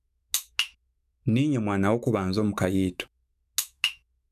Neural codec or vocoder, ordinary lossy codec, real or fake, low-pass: autoencoder, 48 kHz, 128 numbers a frame, DAC-VAE, trained on Japanese speech; none; fake; 14.4 kHz